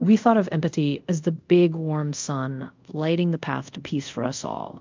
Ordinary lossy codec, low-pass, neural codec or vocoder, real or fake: MP3, 64 kbps; 7.2 kHz; codec, 24 kHz, 0.5 kbps, DualCodec; fake